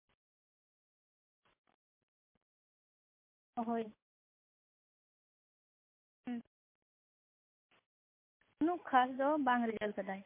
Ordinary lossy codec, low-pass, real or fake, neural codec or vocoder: MP3, 24 kbps; 3.6 kHz; real; none